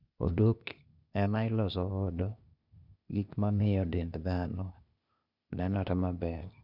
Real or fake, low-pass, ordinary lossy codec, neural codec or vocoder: fake; 5.4 kHz; none; codec, 16 kHz, 0.8 kbps, ZipCodec